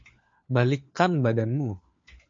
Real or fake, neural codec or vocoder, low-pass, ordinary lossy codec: fake; codec, 16 kHz, 4 kbps, FunCodec, trained on LibriTTS, 50 frames a second; 7.2 kHz; MP3, 48 kbps